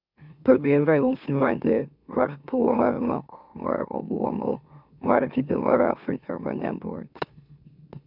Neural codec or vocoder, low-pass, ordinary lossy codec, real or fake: autoencoder, 44.1 kHz, a latent of 192 numbers a frame, MeloTTS; 5.4 kHz; none; fake